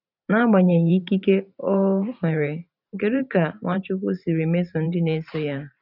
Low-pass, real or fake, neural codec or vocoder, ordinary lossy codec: 5.4 kHz; fake; vocoder, 44.1 kHz, 128 mel bands every 512 samples, BigVGAN v2; none